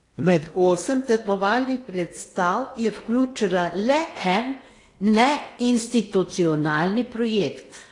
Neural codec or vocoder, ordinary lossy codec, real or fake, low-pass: codec, 16 kHz in and 24 kHz out, 0.8 kbps, FocalCodec, streaming, 65536 codes; AAC, 48 kbps; fake; 10.8 kHz